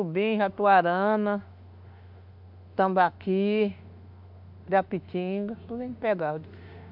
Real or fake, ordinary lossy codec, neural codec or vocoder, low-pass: fake; none; autoencoder, 48 kHz, 32 numbers a frame, DAC-VAE, trained on Japanese speech; 5.4 kHz